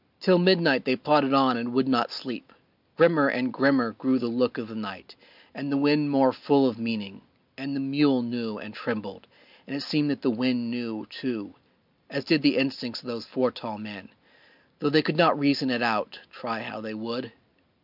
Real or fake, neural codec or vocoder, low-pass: real; none; 5.4 kHz